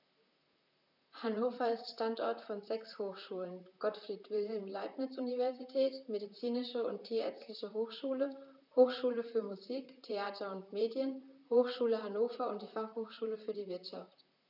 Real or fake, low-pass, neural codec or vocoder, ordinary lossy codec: fake; 5.4 kHz; vocoder, 44.1 kHz, 128 mel bands, Pupu-Vocoder; none